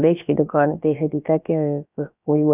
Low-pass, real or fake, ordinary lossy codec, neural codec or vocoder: 3.6 kHz; fake; none; codec, 16 kHz, about 1 kbps, DyCAST, with the encoder's durations